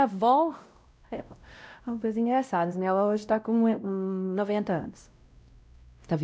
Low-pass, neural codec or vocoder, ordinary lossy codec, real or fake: none; codec, 16 kHz, 0.5 kbps, X-Codec, WavLM features, trained on Multilingual LibriSpeech; none; fake